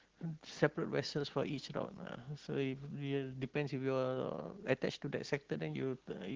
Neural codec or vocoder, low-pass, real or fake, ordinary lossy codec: none; 7.2 kHz; real; Opus, 16 kbps